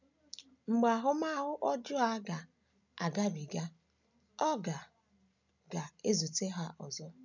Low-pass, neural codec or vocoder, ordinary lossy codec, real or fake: 7.2 kHz; none; none; real